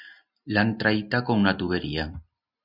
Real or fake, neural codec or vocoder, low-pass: real; none; 5.4 kHz